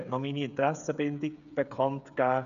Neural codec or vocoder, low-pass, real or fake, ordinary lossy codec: codec, 16 kHz, 8 kbps, FreqCodec, smaller model; 7.2 kHz; fake; none